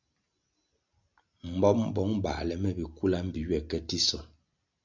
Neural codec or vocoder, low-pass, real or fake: none; 7.2 kHz; real